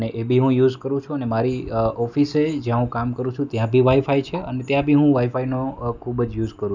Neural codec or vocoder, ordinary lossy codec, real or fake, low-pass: none; none; real; 7.2 kHz